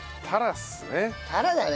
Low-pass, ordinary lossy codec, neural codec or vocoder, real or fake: none; none; none; real